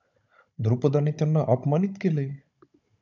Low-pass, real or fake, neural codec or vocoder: 7.2 kHz; fake; codec, 16 kHz, 16 kbps, FunCodec, trained on Chinese and English, 50 frames a second